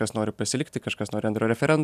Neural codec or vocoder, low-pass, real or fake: none; 14.4 kHz; real